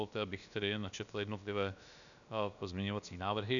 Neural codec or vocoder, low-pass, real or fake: codec, 16 kHz, about 1 kbps, DyCAST, with the encoder's durations; 7.2 kHz; fake